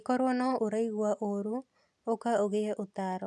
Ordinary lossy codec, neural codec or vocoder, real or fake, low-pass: none; none; real; none